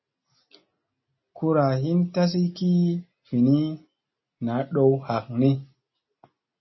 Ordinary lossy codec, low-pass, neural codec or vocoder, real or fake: MP3, 24 kbps; 7.2 kHz; none; real